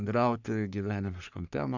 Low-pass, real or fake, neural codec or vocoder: 7.2 kHz; fake; codec, 44.1 kHz, 3.4 kbps, Pupu-Codec